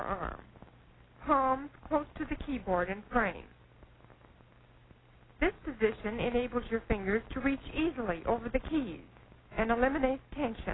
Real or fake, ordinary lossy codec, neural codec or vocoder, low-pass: real; AAC, 16 kbps; none; 7.2 kHz